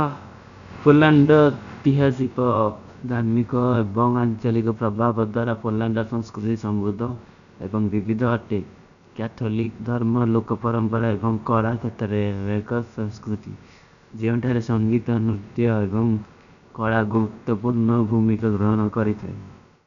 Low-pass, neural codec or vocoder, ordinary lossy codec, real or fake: 7.2 kHz; codec, 16 kHz, about 1 kbps, DyCAST, with the encoder's durations; none; fake